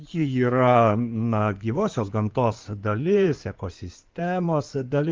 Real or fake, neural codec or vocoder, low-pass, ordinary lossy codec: fake; codec, 16 kHz in and 24 kHz out, 2.2 kbps, FireRedTTS-2 codec; 7.2 kHz; Opus, 32 kbps